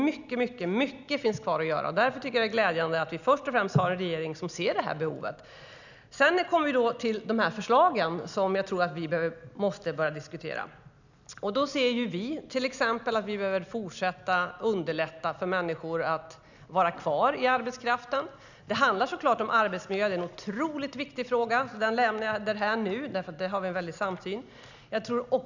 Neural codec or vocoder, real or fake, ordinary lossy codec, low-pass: none; real; none; 7.2 kHz